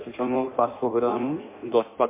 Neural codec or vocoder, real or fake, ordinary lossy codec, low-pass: codec, 16 kHz in and 24 kHz out, 0.6 kbps, FireRedTTS-2 codec; fake; MP3, 24 kbps; 3.6 kHz